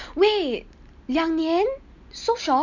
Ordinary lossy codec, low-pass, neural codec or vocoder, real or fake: none; 7.2 kHz; none; real